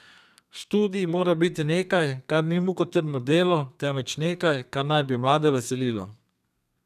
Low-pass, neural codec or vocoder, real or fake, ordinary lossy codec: 14.4 kHz; codec, 32 kHz, 1.9 kbps, SNAC; fake; none